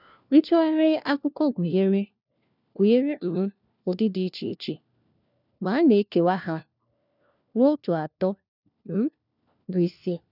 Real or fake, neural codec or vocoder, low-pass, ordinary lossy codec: fake; codec, 16 kHz, 1 kbps, FunCodec, trained on LibriTTS, 50 frames a second; 5.4 kHz; none